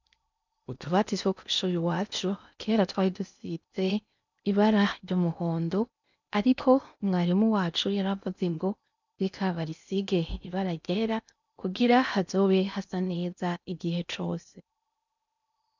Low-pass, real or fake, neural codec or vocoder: 7.2 kHz; fake; codec, 16 kHz in and 24 kHz out, 0.8 kbps, FocalCodec, streaming, 65536 codes